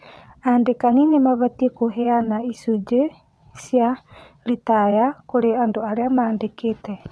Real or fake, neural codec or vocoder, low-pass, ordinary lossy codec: fake; vocoder, 22.05 kHz, 80 mel bands, WaveNeXt; none; none